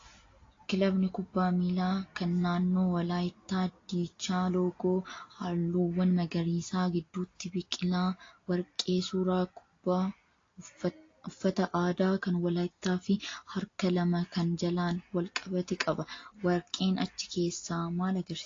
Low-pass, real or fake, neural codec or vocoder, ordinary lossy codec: 7.2 kHz; real; none; AAC, 32 kbps